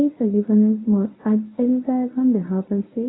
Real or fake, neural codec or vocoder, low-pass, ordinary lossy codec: fake; codec, 24 kHz, 0.9 kbps, WavTokenizer, medium speech release version 1; 7.2 kHz; AAC, 16 kbps